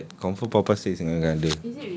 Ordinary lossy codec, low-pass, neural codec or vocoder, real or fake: none; none; none; real